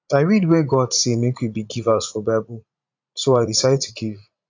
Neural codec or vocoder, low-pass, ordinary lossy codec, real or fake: vocoder, 44.1 kHz, 80 mel bands, Vocos; 7.2 kHz; AAC, 48 kbps; fake